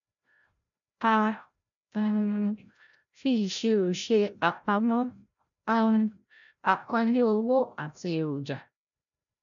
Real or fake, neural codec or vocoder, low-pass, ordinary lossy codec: fake; codec, 16 kHz, 0.5 kbps, FreqCodec, larger model; 7.2 kHz; none